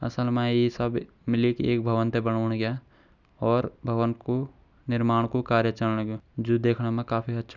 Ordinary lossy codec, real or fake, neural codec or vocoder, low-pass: none; real; none; 7.2 kHz